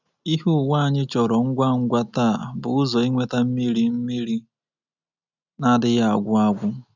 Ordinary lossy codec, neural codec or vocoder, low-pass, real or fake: none; none; 7.2 kHz; real